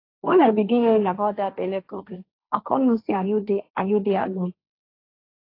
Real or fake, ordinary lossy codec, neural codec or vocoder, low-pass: fake; AAC, 32 kbps; codec, 16 kHz, 1.1 kbps, Voila-Tokenizer; 5.4 kHz